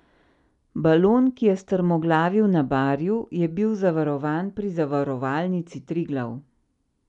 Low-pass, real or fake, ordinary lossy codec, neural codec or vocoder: 10.8 kHz; real; none; none